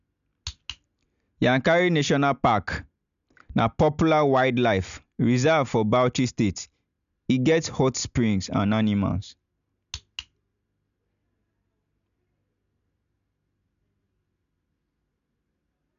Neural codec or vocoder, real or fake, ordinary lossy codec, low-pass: none; real; none; 7.2 kHz